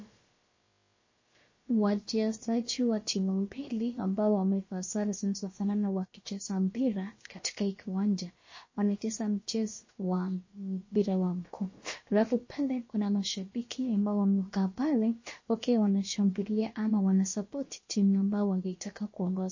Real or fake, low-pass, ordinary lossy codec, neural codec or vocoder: fake; 7.2 kHz; MP3, 32 kbps; codec, 16 kHz, about 1 kbps, DyCAST, with the encoder's durations